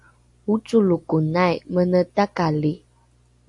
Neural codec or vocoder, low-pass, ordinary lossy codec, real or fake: none; 10.8 kHz; AAC, 64 kbps; real